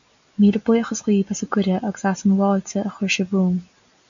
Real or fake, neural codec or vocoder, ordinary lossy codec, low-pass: real; none; MP3, 96 kbps; 7.2 kHz